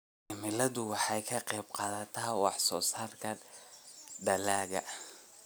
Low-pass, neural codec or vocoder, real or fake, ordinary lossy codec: none; none; real; none